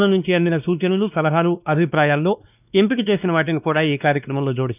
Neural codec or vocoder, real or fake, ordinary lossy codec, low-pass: codec, 16 kHz, 2 kbps, X-Codec, WavLM features, trained on Multilingual LibriSpeech; fake; none; 3.6 kHz